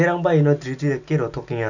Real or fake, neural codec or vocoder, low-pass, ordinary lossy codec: real; none; 7.2 kHz; none